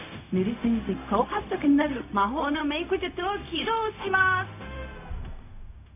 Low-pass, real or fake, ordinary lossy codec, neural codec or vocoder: 3.6 kHz; fake; none; codec, 16 kHz, 0.4 kbps, LongCat-Audio-Codec